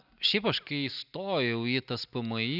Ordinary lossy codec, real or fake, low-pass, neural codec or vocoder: Opus, 64 kbps; real; 5.4 kHz; none